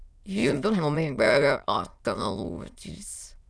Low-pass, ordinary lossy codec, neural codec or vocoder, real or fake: none; none; autoencoder, 22.05 kHz, a latent of 192 numbers a frame, VITS, trained on many speakers; fake